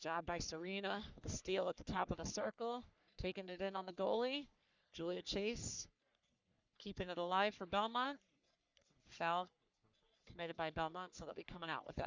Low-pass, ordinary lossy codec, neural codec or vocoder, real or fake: 7.2 kHz; Opus, 64 kbps; codec, 44.1 kHz, 3.4 kbps, Pupu-Codec; fake